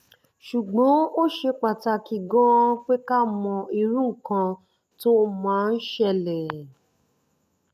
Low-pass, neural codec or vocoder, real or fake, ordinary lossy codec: 14.4 kHz; none; real; none